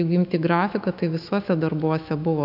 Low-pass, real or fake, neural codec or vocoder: 5.4 kHz; fake; autoencoder, 48 kHz, 128 numbers a frame, DAC-VAE, trained on Japanese speech